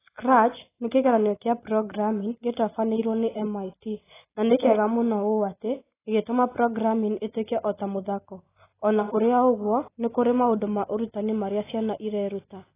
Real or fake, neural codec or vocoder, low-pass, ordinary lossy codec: real; none; 3.6 kHz; AAC, 16 kbps